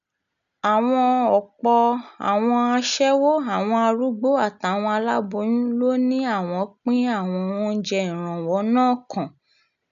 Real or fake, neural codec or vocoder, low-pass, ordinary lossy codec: real; none; 7.2 kHz; none